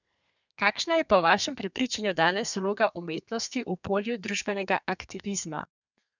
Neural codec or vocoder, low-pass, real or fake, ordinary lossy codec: codec, 32 kHz, 1.9 kbps, SNAC; 7.2 kHz; fake; none